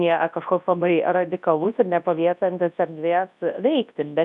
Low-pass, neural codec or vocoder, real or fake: 10.8 kHz; codec, 24 kHz, 0.9 kbps, WavTokenizer, large speech release; fake